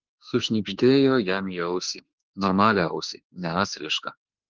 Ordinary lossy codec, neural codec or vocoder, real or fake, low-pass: Opus, 16 kbps; autoencoder, 48 kHz, 32 numbers a frame, DAC-VAE, trained on Japanese speech; fake; 7.2 kHz